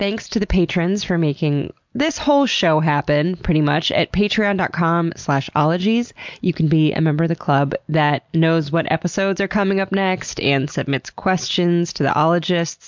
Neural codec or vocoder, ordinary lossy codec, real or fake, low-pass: none; MP3, 64 kbps; real; 7.2 kHz